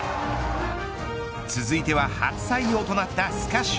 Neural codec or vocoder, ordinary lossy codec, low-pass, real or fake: none; none; none; real